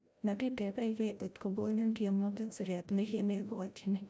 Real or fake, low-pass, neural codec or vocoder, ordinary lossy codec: fake; none; codec, 16 kHz, 0.5 kbps, FreqCodec, larger model; none